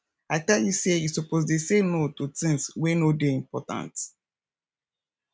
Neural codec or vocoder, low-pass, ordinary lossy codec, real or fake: none; none; none; real